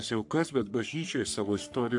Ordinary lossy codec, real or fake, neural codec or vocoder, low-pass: AAC, 64 kbps; fake; codec, 44.1 kHz, 3.4 kbps, Pupu-Codec; 10.8 kHz